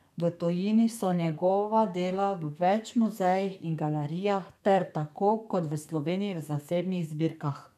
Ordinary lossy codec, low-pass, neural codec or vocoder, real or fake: none; 14.4 kHz; codec, 32 kHz, 1.9 kbps, SNAC; fake